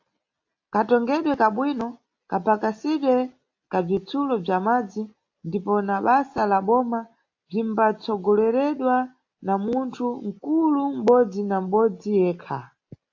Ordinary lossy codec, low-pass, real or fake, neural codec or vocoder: AAC, 48 kbps; 7.2 kHz; real; none